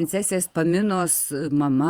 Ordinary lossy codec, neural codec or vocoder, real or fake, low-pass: Opus, 64 kbps; vocoder, 48 kHz, 128 mel bands, Vocos; fake; 19.8 kHz